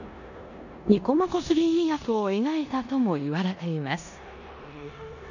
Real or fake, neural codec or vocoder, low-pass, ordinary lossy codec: fake; codec, 16 kHz in and 24 kHz out, 0.9 kbps, LongCat-Audio-Codec, four codebook decoder; 7.2 kHz; none